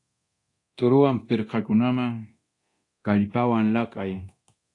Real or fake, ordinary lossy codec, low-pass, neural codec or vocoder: fake; AAC, 48 kbps; 10.8 kHz; codec, 24 kHz, 0.9 kbps, DualCodec